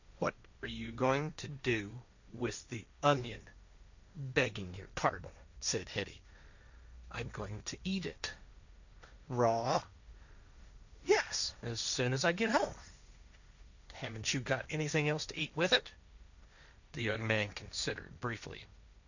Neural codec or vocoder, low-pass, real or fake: codec, 16 kHz, 1.1 kbps, Voila-Tokenizer; 7.2 kHz; fake